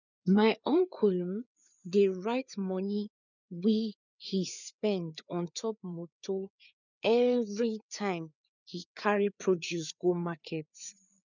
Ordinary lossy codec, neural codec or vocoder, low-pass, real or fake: none; codec, 16 kHz, 4 kbps, FreqCodec, larger model; 7.2 kHz; fake